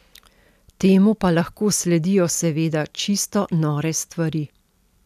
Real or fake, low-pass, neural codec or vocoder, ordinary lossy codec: real; 14.4 kHz; none; none